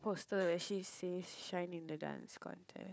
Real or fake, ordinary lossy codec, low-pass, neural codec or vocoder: fake; none; none; codec, 16 kHz, 4 kbps, FunCodec, trained on Chinese and English, 50 frames a second